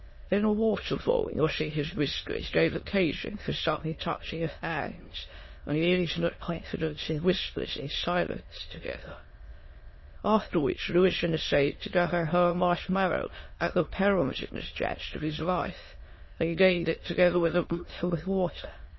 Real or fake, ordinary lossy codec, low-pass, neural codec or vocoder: fake; MP3, 24 kbps; 7.2 kHz; autoencoder, 22.05 kHz, a latent of 192 numbers a frame, VITS, trained on many speakers